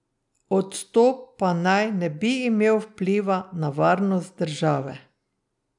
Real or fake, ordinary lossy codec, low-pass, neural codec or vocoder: real; none; 10.8 kHz; none